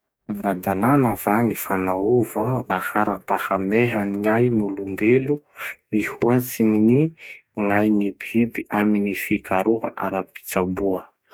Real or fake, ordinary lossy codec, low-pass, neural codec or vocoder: fake; none; none; codec, 44.1 kHz, 2.6 kbps, DAC